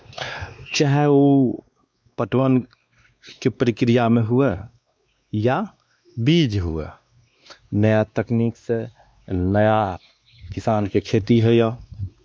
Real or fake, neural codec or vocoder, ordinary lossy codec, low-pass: fake; codec, 16 kHz, 2 kbps, X-Codec, WavLM features, trained on Multilingual LibriSpeech; none; none